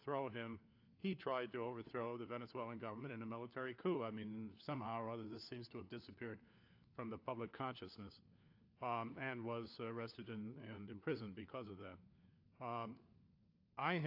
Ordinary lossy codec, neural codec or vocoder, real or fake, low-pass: AAC, 32 kbps; codec, 16 kHz, 4 kbps, FunCodec, trained on LibriTTS, 50 frames a second; fake; 5.4 kHz